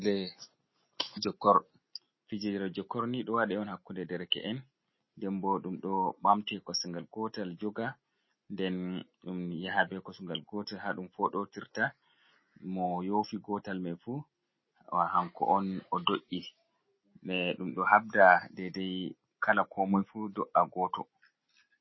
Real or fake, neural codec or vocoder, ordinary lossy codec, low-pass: real; none; MP3, 24 kbps; 7.2 kHz